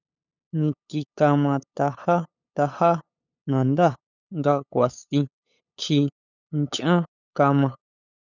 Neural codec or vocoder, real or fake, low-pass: codec, 16 kHz, 8 kbps, FunCodec, trained on LibriTTS, 25 frames a second; fake; 7.2 kHz